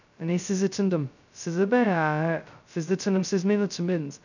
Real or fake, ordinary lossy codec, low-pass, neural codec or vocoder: fake; none; 7.2 kHz; codec, 16 kHz, 0.2 kbps, FocalCodec